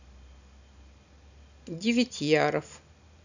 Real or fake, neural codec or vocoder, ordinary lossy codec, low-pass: real; none; none; 7.2 kHz